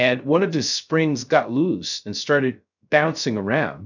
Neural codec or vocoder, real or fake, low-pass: codec, 16 kHz, 0.3 kbps, FocalCodec; fake; 7.2 kHz